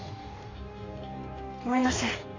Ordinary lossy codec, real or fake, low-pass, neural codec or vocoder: AAC, 32 kbps; fake; 7.2 kHz; codec, 44.1 kHz, 2.6 kbps, DAC